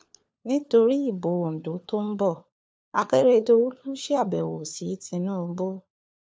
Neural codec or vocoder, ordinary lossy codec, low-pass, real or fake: codec, 16 kHz, 4 kbps, FunCodec, trained on LibriTTS, 50 frames a second; none; none; fake